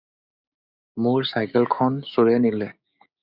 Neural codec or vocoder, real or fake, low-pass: codec, 16 kHz, 6 kbps, DAC; fake; 5.4 kHz